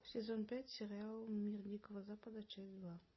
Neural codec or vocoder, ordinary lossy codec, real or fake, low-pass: none; MP3, 24 kbps; real; 7.2 kHz